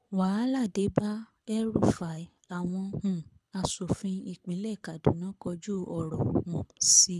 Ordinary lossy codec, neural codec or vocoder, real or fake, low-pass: none; codec, 24 kHz, 6 kbps, HILCodec; fake; none